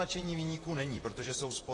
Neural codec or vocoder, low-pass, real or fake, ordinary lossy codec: vocoder, 44.1 kHz, 128 mel bands, Pupu-Vocoder; 10.8 kHz; fake; AAC, 32 kbps